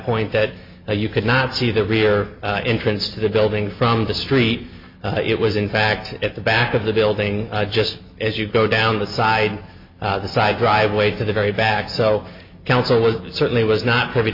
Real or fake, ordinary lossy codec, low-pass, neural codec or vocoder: real; MP3, 32 kbps; 5.4 kHz; none